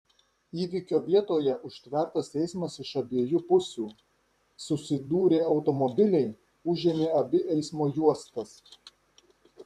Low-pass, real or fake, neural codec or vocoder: 14.4 kHz; fake; vocoder, 44.1 kHz, 128 mel bands, Pupu-Vocoder